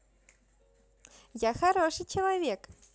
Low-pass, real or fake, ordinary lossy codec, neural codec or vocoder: none; real; none; none